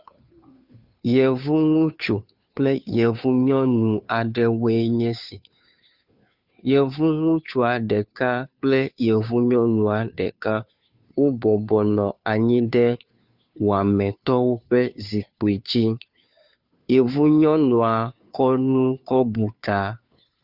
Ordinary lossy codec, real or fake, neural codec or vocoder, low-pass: Opus, 64 kbps; fake; codec, 16 kHz, 2 kbps, FunCodec, trained on Chinese and English, 25 frames a second; 5.4 kHz